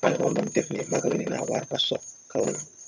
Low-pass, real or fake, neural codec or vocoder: 7.2 kHz; fake; vocoder, 22.05 kHz, 80 mel bands, HiFi-GAN